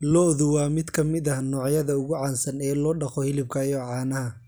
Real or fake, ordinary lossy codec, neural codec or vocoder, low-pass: real; none; none; none